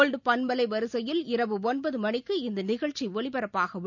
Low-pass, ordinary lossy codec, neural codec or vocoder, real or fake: 7.2 kHz; none; none; real